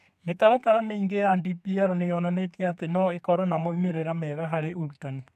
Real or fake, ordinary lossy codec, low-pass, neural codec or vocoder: fake; none; 14.4 kHz; codec, 32 kHz, 1.9 kbps, SNAC